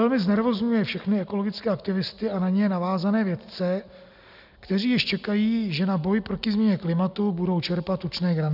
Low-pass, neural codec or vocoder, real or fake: 5.4 kHz; none; real